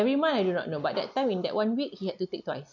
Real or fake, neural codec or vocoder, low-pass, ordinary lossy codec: real; none; 7.2 kHz; none